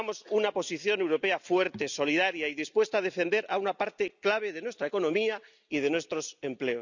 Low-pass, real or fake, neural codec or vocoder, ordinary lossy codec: 7.2 kHz; real; none; none